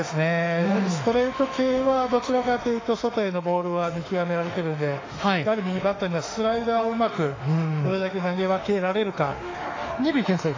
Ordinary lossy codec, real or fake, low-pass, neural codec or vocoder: AAC, 32 kbps; fake; 7.2 kHz; autoencoder, 48 kHz, 32 numbers a frame, DAC-VAE, trained on Japanese speech